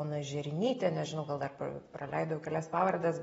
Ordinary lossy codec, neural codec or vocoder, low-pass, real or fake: AAC, 24 kbps; none; 19.8 kHz; real